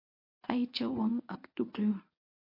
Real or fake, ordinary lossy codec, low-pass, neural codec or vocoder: fake; MP3, 32 kbps; 5.4 kHz; codec, 24 kHz, 0.9 kbps, WavTokenizer, medium speech release version 1